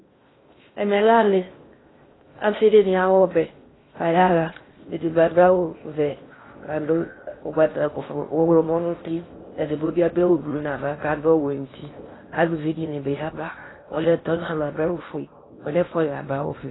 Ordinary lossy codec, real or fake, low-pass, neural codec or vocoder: AAC, 16 kbps; fake; 7.2 kHz; codec, 16 kHz in and 24 kHz out, 0.6 kbps, FocalCodec, streaming, 2048 codes